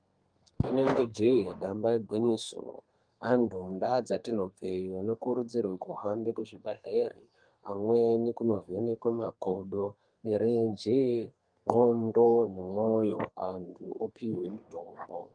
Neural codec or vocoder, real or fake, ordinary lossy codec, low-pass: codec, 32 kHz, 1.9 kbps, SNAC; fake; Opus, 32 kbps; 9.9 kHz